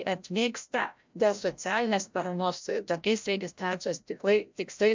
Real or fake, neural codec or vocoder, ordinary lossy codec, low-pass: fake; codec, 16 kHz, 0.5 kbps, FreqCodec, larger model; MP3, 64 kbps; 7.2 kHz